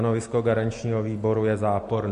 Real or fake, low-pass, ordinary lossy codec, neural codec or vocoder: real; 14.4 kHz; MP3, 48 kbps; none